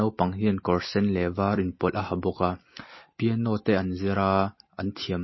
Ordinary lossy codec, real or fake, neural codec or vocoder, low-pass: MP3, 24 kbps; real; none; 7.2 kHz